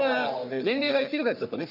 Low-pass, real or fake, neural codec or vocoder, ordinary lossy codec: 5.4 kHz; fake; codec, 44.1 kHz, 3.4 kbps, Pupu-Codec; none